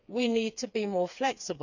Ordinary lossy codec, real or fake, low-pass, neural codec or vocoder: none; fake; 7.2 kHz; codec, 16 kHz, 4 kbps, FreqCodec, smaller model